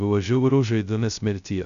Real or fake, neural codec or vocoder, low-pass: fake; codec, 16 kHz, 0.2 kbps, FocalCodec; 7.2 kHz